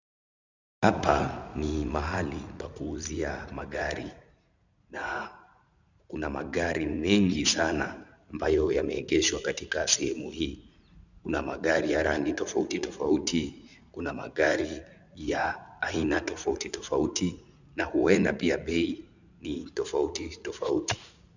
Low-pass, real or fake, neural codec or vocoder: 7.2 kHz; fake; vocoder, 44.1 kHz, 80 mel bands, Vocos